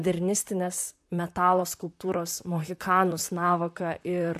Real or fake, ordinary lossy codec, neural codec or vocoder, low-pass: fake; AAC, 96 kbps; vocoder, 44.1 kHz, 128 mel bands, Pupu-Vocoder; 14.4 kHz